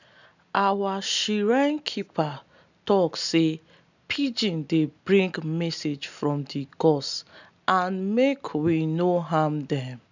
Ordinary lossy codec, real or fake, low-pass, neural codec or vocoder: none; real; 7.2 kHz; none